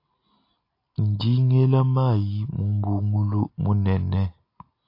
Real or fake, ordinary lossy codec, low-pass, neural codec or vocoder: real; AAC, 32 kbps; 5.4 kHz; none